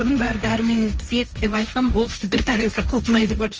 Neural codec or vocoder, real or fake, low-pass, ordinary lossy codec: codec, 16 kHz, 1.1 kbps, Voila-Tokenizer; fake; 7.2 kHz; Opus, 24 kbps